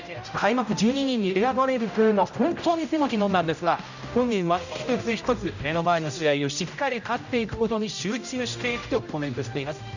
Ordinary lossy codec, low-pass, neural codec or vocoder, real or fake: none; 7.2 kHz; codec, 16 kHz, 0.5 kbps, X-Codec, HuBERT features, trained on general audio; fake